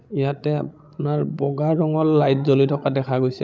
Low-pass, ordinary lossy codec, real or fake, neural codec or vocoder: none; none; fake; codec, 16 kHz, 16 kbps, FreqCodec, larger model